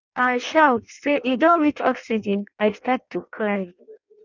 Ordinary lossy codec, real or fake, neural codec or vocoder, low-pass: none; fake; codec, 16 kHz in and 24 kHz out, 0.6 kbps, FireRedTTS-2 codec; 7.2 kHz